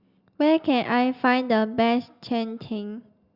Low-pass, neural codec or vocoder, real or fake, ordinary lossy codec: 5.4 kHz; none; real; Opus, 64 kbps